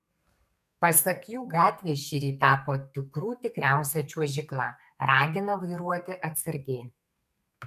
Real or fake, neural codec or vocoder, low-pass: fake; codec, 32 kHz, 1.9 kbps, SNAC; 14.4 kHz